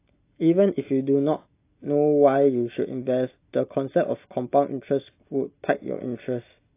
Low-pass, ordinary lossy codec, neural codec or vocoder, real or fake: 3.6 kHz; AAC, 24 kbps; none; real